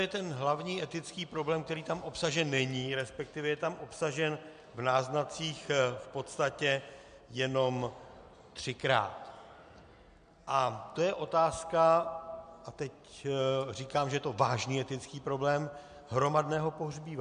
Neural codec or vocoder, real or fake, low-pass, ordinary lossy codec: none; real; 9.9 kHz; MP3, 64 kbps